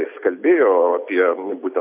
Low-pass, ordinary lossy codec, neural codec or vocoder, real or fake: 3.6 kHz; AAC, 32 kbps; none; real